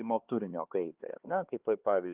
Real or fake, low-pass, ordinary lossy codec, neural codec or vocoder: fake; 3.6 kHz; Opus, 32 kbps; codec, 16 kHz, 4 kbps, X-Codec, HuBERT features, trained on LibriSpeech